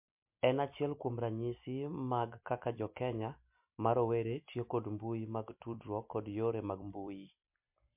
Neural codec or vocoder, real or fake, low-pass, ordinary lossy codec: vocoder, 44.1 kHz, 128 mel bands every 256 samples, BigVGAN v2; fake; 3.6 kHz; MP3, 32 kbps